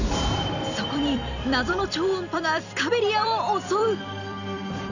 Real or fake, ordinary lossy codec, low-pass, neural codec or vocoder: fake; none; 7.2 kHz; vocoder, 44.1 kHz, 128 mel bands every 256 samples, BigVGAN v2